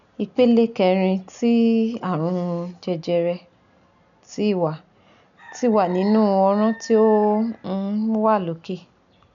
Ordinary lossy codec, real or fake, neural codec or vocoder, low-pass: none; real; none; 7.2 kHz